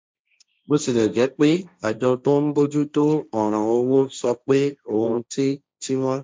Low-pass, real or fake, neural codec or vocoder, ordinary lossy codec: none; fake; codec, 16 kHz, 1.1 kbps, Voila-Tokenizer; none